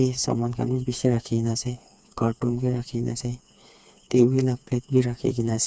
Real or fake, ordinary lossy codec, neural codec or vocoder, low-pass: fake; none; codec, 16 kHz, 4 kbps, FreqCodec, smaller model; none